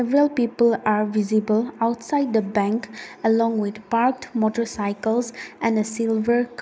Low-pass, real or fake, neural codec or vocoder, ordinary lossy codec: none; real; none; none